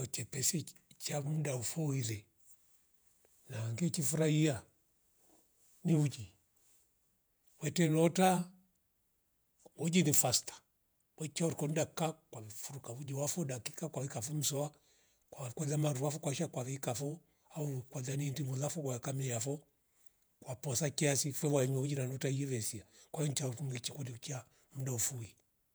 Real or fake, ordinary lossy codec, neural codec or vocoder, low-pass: fake; none; vocoder, 48 kHz, 128 mel bands, Vocos; none